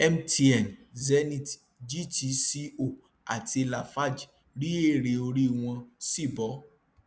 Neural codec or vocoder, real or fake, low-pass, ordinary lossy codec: none; real; none; none